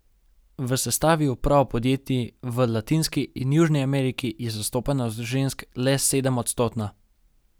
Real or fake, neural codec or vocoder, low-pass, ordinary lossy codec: real; none; none; none